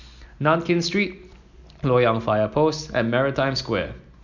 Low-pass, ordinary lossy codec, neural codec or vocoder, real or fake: 7.2 kHz; none; none; real